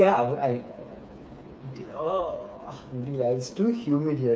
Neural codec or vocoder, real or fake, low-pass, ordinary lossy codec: codec, 16 kHz, 4 kbps, FreqCodec, smaller model; fake; none; none